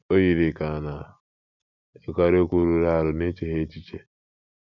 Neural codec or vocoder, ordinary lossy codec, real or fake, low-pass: none; none; real; 7.2 kHz